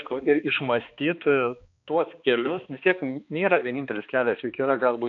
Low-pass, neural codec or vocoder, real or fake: 7.2 kHz; codec, 16 kHz, 2 kbps, X-Codec, HuBERT features, trained on balanced general audio; fake